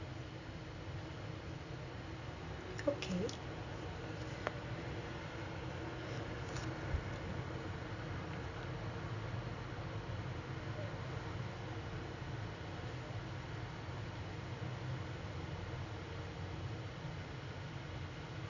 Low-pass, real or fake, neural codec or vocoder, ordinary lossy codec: 7.2 kHz; real; none; none